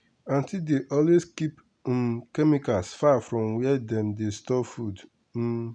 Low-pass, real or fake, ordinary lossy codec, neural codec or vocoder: 9.9 kHz; real; none; none